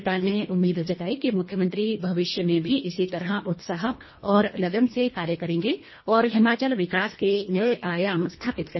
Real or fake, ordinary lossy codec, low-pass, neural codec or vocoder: fake; MP3, 24 kbps; 7.2 kHz; codec, 24 kHz, 1.5 kbps, HILCodec